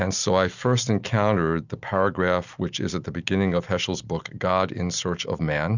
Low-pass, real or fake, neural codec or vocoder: 7.2 kHz; real; none